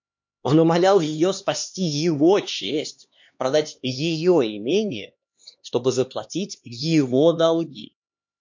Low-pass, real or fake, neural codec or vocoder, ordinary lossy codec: 7.2 kHz; fake; codec, 16 kHz, 2 kbps, X-Codec, HuBERT features, trained on LibriSpeech; MP3, 48 kbps